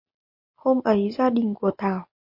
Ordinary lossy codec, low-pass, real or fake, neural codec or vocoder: MP3, 32 kbps; 5.4 kHz; real; none